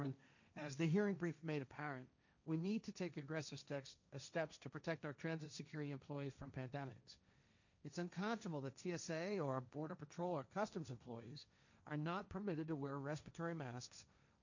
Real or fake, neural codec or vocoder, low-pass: fake; codec, 16 kHz, 1.1 kbps, Voila-Tokenizer; 7.2 kHz